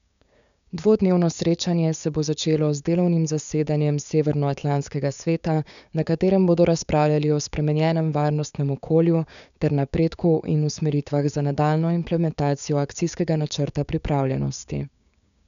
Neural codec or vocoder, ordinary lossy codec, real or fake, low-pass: codec, 16 kHz, 6 kbps, DAC; none; fake; 7.2 kHz